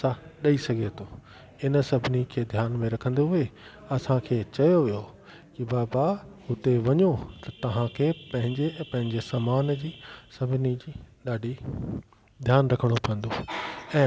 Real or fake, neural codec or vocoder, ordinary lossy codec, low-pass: real; none; none; none